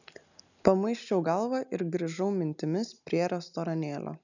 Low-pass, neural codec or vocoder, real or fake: 7.2 kHz; none; real